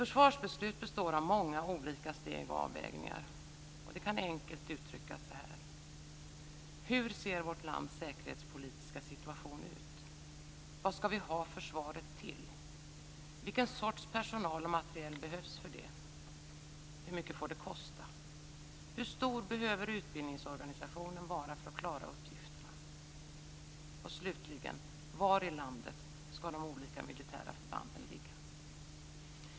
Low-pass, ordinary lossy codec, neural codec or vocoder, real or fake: none; none; none; real